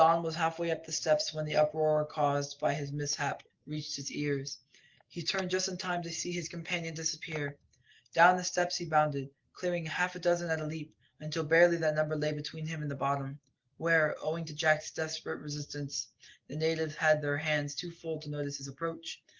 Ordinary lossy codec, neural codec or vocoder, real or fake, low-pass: Opus, 16 kbps; none; real; 7.2 kHz